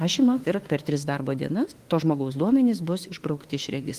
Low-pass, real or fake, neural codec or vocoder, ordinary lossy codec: 14.4 kHz; fake; autoencoder, 48 kHz, 32 numbers a frame, DAC-VAE, trained on Japanese speech; Opus, 24 kbps